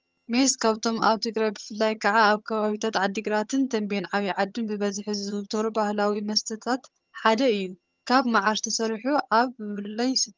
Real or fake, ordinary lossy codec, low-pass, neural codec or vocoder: fake; Opus, 24 kbps; 7.2 kHz; vocoder, 22.05 kHz, 80 mel bands, HiFi-GAN